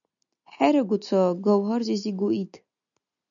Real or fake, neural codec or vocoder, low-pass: real; none; 7.2 kHz